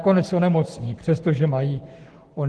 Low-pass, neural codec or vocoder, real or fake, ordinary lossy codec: 10.8 kHz; codec, 44.1 kHz, 7.8 kbps, Pupu-Codec; fake; Opus, 16 kbps